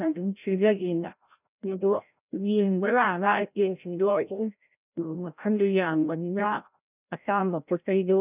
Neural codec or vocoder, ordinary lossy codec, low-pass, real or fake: codec, 16 kHz, 0.5 kbps, FreqCodec, larger model; none; 3.6 kHz; fake